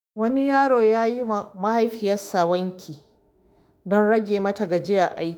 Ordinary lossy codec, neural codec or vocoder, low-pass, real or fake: none; autoencoder, 48 kHz, 32 numbers a frame, DAC-VAE, trained on Japanese speech; none; fake